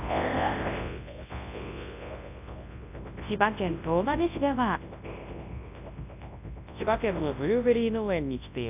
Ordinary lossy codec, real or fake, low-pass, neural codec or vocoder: AAC, 32 kbps; fake; 3.6 kHz; codec, 24 kHz, 0.9 kbps, WavTokenizer, large speech release